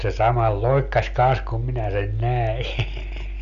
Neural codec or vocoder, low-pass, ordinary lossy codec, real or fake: none; 7.2 kHz; none; real